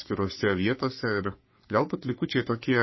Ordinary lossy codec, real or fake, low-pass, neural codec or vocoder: MP3, 24 kbps; fake; 7.2 kHz; codec, 44.1 kHz, 3.4 kbps, Pupu-Codec